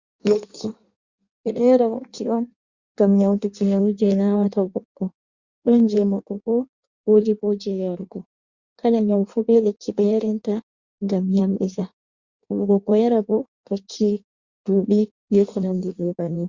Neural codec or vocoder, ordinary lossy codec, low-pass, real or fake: codec, 16 kHz in and 24 kHz out, 1.1 kbps, FireRedTTS-2 codec; Opus, 64 kbps; 7.2 kHz; fake